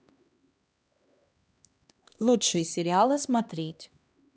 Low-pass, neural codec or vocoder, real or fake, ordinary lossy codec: none; codec, 16 kHz, 1 kbps, X-Codec, HuBERT features, trained on LibriSpeech; fake; none